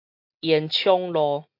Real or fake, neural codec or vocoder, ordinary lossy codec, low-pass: real; none; MP3, 48 kbps; 5.4 kHz